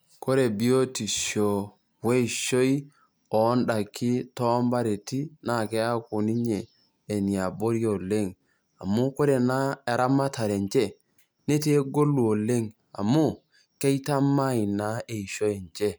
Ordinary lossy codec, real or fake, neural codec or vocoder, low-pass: none; real; none; none